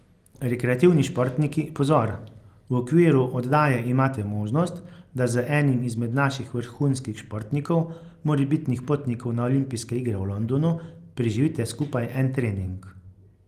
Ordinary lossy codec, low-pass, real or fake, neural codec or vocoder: Opus, 24 kbps; 14.4 kHz; real; none